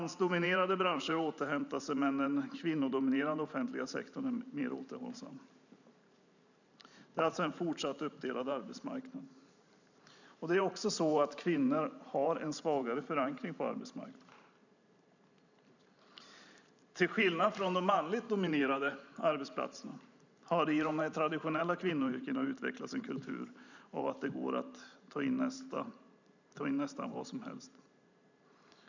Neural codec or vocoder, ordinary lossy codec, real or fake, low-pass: vocoder, 44.1 kHz, 128 mel bands every 512 samples, BigVGAN v2; none; fake; 7.2 kHz